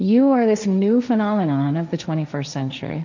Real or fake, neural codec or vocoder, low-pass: fake; codec, 16 kHz, 1.1 kbps, Voila-Tokenizer; 7.2 kHz